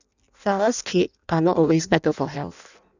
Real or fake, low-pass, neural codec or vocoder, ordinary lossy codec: fake; 7.2 kHz; codec, 16 kHz in and 24 kHz out, 0.6 kbps, FireRedTTS-2 codec; none